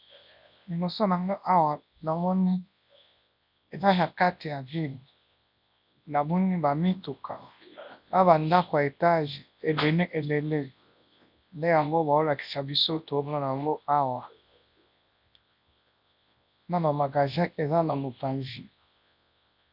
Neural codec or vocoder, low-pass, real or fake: codec, 24 kHz, 0.9 kbps, WavTokenizer, large speech release; 5.4 kHz; fake